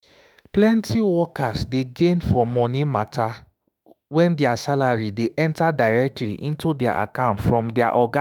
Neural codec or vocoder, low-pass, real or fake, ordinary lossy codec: autoencoder, 48 kHz, 32 numbers a frame, DAC-VAE, trained on Japanese speech; none; fake; none